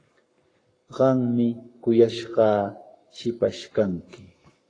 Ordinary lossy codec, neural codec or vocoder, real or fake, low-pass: AAC, 32 kbps; codec, 44.1 kHz, 7.8 kbps, Pupu-Codec; fake; 9.9 kHz